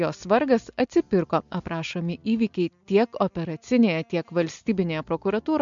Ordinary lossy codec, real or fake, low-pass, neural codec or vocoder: MP3, 64 kbps; real; 7.2 kHz; none